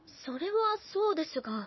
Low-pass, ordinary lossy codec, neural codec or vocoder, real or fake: 7.2 kHz; MP3, 24 kbps; codec, 16 kHz, 4 kbps, FunCodec, trained on Chinese and English, 50 frames a second; fake